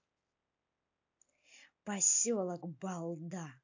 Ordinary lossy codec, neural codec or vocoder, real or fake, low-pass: AAC, 48 kbps; none; real; 7.2 kHz